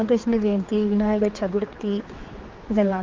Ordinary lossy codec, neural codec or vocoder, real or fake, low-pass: Opus, 32 kbps; codec, 16 kHz, 4 kbps, X-Codec, HuBERT features, trained on general audio; fake; 7.2 kHz